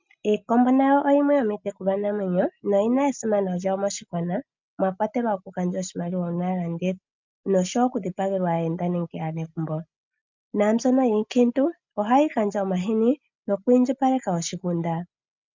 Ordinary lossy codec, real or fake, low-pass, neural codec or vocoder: MP3, 64 kbps; real; 7.2 kHz; none